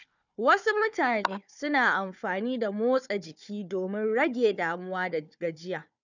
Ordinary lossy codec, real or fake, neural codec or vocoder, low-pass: none; fake; codec, 16 kHz, 4 kbps, FunCodec, trained on Chinese and English, 50 frames a second; 7.2 kHz